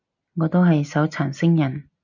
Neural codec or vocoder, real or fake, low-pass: none; real; 7.2 kHz